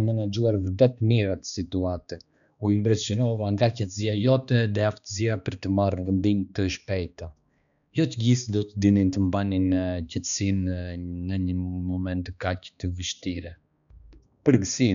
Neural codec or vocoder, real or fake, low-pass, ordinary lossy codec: codec, 16 kHz, 2 kbps, X-Codec, HuBERT features, trained on balanced general audio; fake; 7.2 kHz; none